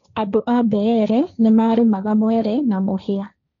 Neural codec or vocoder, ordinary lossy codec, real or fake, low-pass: codec, 16 kHz, 1.1 kbps, Voila-Tokenizer; none; fake; 7.2 kHz